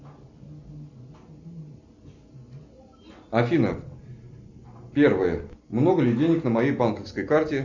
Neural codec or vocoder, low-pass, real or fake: none; 7.2 kHz; real